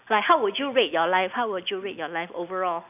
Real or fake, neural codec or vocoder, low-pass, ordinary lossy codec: real; none; 3.6 kHz; none